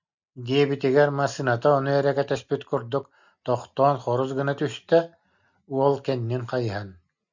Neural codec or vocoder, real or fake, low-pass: none; real; 7.2 kHz